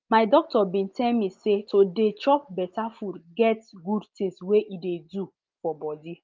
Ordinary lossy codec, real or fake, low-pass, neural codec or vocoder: Opus, 32 kbps; real; 7.2 kHz; none